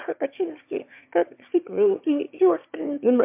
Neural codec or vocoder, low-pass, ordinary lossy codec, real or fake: autoencoder, 22.05 kHz, a latent of 192 numbers a frame, VITS, trained on one speaker; 3.6 kHz; MP3, 32 kbps; fake